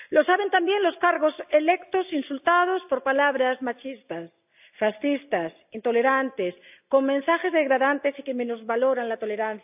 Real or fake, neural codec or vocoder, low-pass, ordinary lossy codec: real; none; 3.6 kHz; AAC, 32 kbps